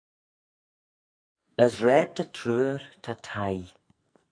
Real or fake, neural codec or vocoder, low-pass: fake; codec, 44.1 kHz, 2.6 kbps, SNAC; 9.9 kHz